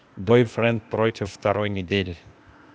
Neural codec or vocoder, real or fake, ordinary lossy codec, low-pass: codec, 16 kHz, 0.8 kbps, ZipCodec; fake; none; none